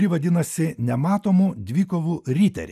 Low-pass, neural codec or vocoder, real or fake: 14.4 kHz; none; real